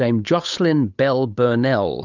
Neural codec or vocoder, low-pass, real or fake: codec, 16 kHz, 8 kbps, FunCodec, trained on Chinese and English, 25 frames a second; 7.2 kHz; fake